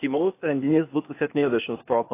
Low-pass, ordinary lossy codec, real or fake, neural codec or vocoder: 3.6 kHz; AAC, 24 kbps; fake; codec, 16 kHz, 0.8 kbps, ZipCodec